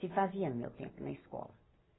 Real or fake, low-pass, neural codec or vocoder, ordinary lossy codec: real; 7.2 kHz; none; AAC, 16 kbps